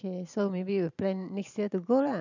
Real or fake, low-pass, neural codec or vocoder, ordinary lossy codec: fake; 7.2 kHz; vocoder, 44.1 kHz, 128 mel bands every 512 samples, BigVGAN v2; none